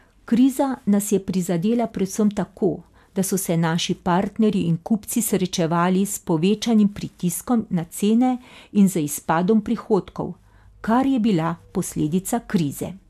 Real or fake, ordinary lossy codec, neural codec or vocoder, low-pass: real; MP3, 96 kbps; none; 14.4 kHz